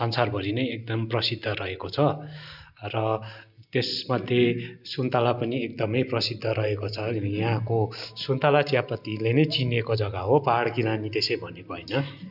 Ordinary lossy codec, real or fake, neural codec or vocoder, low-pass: none; real; none; 5.4 kHz